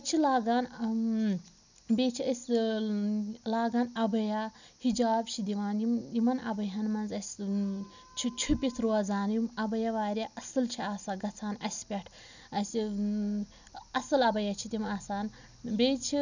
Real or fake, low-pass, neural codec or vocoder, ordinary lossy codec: real; 7.2 kHz; none; none